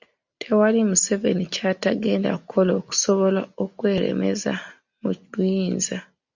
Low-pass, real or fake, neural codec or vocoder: 7.2 kHz; real; none